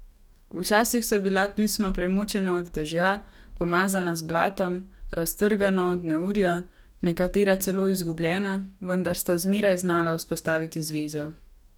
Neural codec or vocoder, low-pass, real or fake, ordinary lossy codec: codec, 44.1 kHz, 2.6 kbps, DAC; 19.8 kHz; fake; none